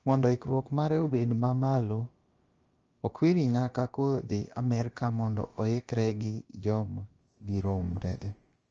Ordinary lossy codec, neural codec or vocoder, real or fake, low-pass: Opus, 24 kbps; codec, 16 kHz, about 1 kbps, DyCAST, with the encoder's durations; fake; 7.2 kHz